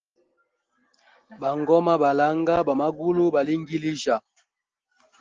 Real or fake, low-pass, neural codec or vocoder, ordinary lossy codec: real; 7.2 kHz; none; Opus, 16 kbps